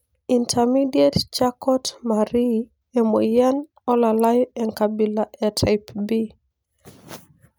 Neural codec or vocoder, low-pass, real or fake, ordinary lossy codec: none; none; real; none